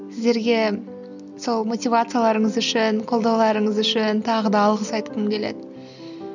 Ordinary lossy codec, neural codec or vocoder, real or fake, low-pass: none; none; real; 7.2 kHz